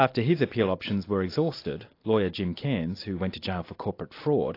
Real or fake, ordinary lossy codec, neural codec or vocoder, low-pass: real; AAC, 32 kbps; none; 5.4 kHz